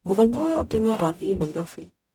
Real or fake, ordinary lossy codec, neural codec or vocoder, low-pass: fake; none; codec, 44.1 kHz, 0.9 kbps, DAC; 19.8 kHz